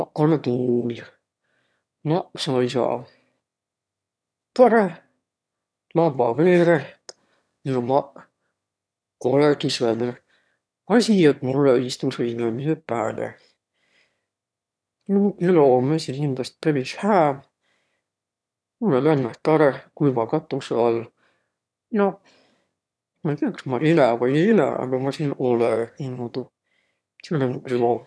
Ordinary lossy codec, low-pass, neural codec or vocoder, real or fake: none; none; autoencoder, 22.05 kHz, a latent of 192 numbers a frame, VITS, trained on one speaker; fake